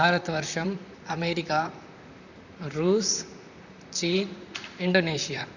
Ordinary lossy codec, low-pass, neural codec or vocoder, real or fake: none; 7.2 kHz; vocoder, 44.1 kHz, 128 mel bands, Pupu-Vocoder; fake